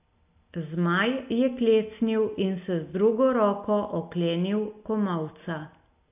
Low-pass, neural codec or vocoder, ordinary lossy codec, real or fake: 3.6 kHz; none; AAC, 32 kbps; real